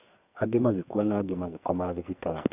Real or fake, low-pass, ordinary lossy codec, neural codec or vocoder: fake; 3.6 kHz; none; codec, 44.1 kHz, 2.6 kbps, SNAC